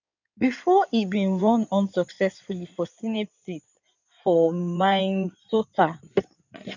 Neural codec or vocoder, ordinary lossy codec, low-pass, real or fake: codec, 16 kHz in and 24 kHz out, 2.2 kbps, FireRedTTS-2 codec; none; 7.2 kHz; fake